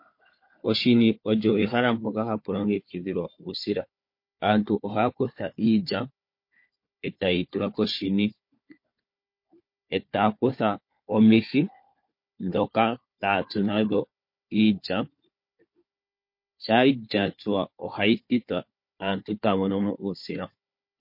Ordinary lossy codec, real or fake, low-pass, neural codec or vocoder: MP3, 32 kbps; fake; 5.4 kHz; codec, 16 kHz, 4 kbps, FunCodec, trained on Chinese and English, 50 frames a second